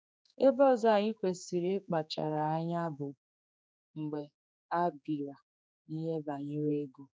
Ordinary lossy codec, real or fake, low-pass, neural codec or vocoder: none; fake; none; codec, 16 kHz, 4 kbps, X-Codec, HuBERT features, trained on general audio